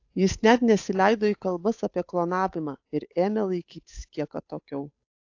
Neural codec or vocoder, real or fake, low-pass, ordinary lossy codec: codec, 16 kHz, 8 kbps, FunCodec, trained on Chinese and English, 25 frames a second; fake; 7.2 kHz; AAC, 48 kbps